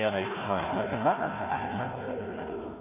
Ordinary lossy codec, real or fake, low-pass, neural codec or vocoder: MP3, 24 kbps; fake; 3.6 kHz; codec, 16 kHz, 1 kbps, FunCodec, trained on Chinese and English, 50 frames a second